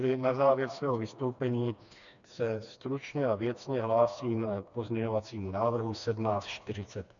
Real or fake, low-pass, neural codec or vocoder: fake; 7.2 kHz; codec, 16 kHz, 2 kbps, FreqCodec, smaller model